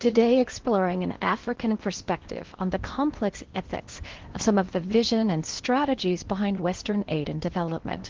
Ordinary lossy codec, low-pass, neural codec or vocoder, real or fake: Opus, 16 kbps; 7.2 kHz; codec, 16 kHz in and 24 kHz out, 0.8 kbps, FocalCodec, streaming, 65536 codes; fake